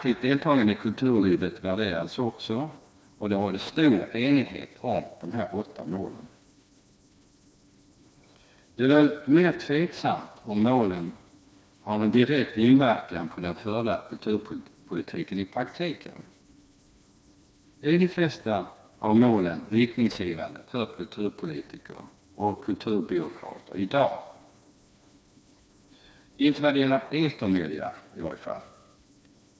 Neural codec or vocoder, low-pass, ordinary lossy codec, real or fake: codec, 16 kHz, 2 kbps, FreqCodec, smaller model; none; none; fake